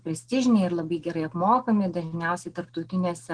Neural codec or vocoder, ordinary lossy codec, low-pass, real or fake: none; Opus, 16 kbps; 9.9 kHz; real